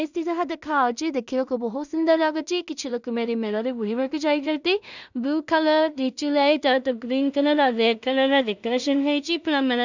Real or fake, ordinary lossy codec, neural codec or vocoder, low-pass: fake; none; codec, 16 kHz in and 24 kHz out, 0.4 kbps, LongCat-Audio-Codec, two codebook decoder; 7.2 kHz